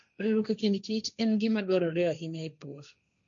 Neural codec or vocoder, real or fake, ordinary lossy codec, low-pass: codec, 16 kHz, 1.1 kbps, Voila-Tokenizer; fake; none; 7.2 kHz